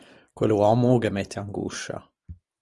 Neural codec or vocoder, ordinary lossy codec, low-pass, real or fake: none; Opus, 24 kbps; 10.8 kHz; real